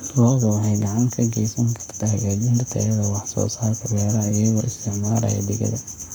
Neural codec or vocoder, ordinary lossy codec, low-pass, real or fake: codec, 44.1 kHz, 7.8 kbps, Pupu-Codec; none; none; fake